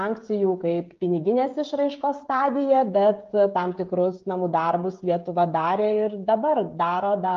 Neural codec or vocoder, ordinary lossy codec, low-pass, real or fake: codec, 16 kHz, 16 kbps, FreqCodec, smaller model; Opus, 32 kbps; 7.2 kHz; fake